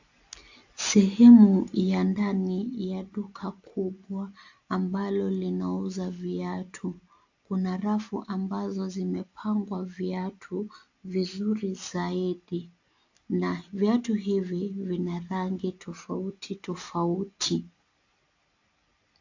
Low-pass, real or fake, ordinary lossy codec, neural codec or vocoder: 7.2 kHz; real; AAC, 48 kbps; none